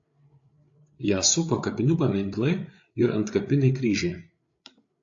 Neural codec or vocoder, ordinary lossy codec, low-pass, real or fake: codec, 16 kHz, 8 kbps, FreqCodec, larger model; AAC, 48 kbps; 7.2 kHz; fake